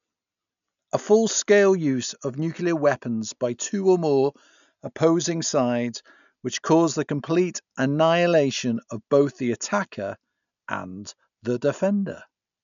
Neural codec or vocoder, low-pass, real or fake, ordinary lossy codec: none; 7.2 kHz; real; none